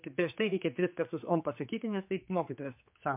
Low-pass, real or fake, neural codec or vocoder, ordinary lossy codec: 3.6 kHz; fake; codec, 16 kHz, 4 kbps, X-Codec, HuBERT features, trained on general audio; MP3, 32 kbps